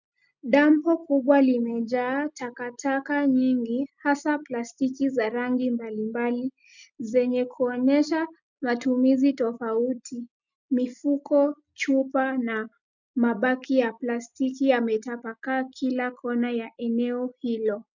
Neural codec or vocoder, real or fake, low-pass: none; real; 7.2 kHz